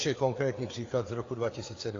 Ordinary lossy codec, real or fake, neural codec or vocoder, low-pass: AAC, 32 kbps; fake; codec, 16 kHz, 4 kbps, FreqCodec, larger model; 7.2 kHz